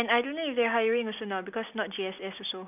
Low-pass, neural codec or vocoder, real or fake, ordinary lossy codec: 3.6 kHz; none; real; none